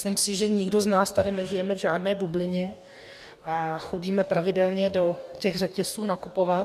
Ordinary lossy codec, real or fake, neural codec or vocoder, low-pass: MP3, 96 kbps; fake; codec, 44.1 kHz, 2.6 kbps, DAC; 14.4 kHz